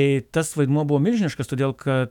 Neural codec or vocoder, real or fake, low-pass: autoencoder, 48 kHz, 128 numbers a frame, DAC-VAE, trained on Japanese speech; fake; 19.8 kHz